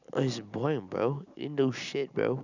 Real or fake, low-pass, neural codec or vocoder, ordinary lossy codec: real; 7.2 kHz; none; none